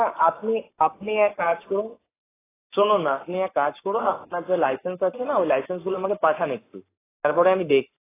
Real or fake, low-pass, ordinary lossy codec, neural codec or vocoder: fake; 3.6 kHz; AAC, 16 kbps; codec, 44.1 kHz, 7.8 kbps, Pupu-Codec